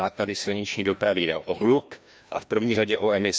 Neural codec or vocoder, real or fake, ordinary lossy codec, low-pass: codec, 16 kHz, 1 kbps, FreqCodec, larger model; fake; none; none